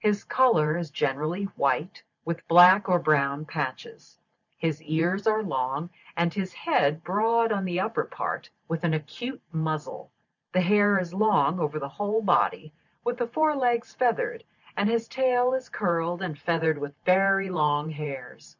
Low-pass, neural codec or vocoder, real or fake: 7.2 kHz; vocoder, 44.1 kHz, 128 mel bands every 512 samples, BigVGAN v2; fake